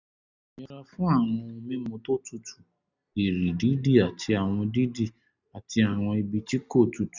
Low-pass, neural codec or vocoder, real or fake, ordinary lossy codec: 7.2 kHz; none; real; none